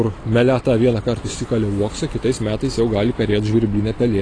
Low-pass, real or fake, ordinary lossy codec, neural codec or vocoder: 9.9 kHz; real; AAC, 32 kbps; none